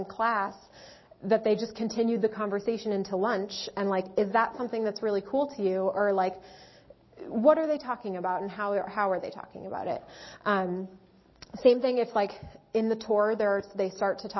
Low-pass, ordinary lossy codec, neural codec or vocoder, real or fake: 7.2 kHz; MP3, 24 kbps; none; real